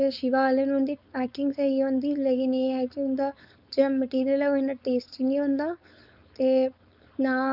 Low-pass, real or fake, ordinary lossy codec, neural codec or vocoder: 5.4 kHz; fake; none; codec, 16 kHz, 4.8 kbps, FACodec